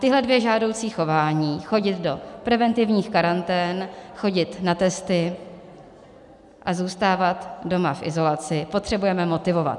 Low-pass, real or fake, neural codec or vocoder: 10.8 kHz; real; none